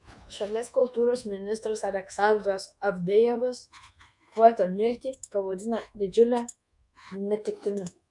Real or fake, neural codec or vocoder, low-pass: fake; codec, 24 kHz, 1.2 kbps, DualCodec; 10.8 kHz